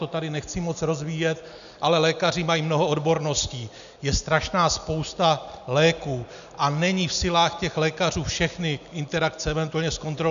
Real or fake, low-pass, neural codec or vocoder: real; 7.2 kHz; none